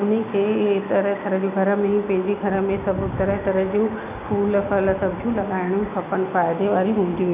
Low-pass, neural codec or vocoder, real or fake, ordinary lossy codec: 3.6 kHz; none; real; none